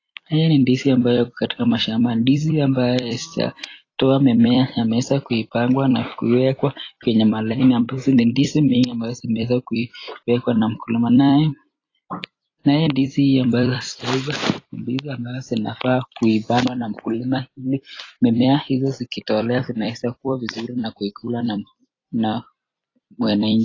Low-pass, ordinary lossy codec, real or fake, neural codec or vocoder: 7.2 kHz; AAC, 32 kbps; fake; vocoder, 44.1 kHz, 128 mel bands every 256 samples, BigVGAN v2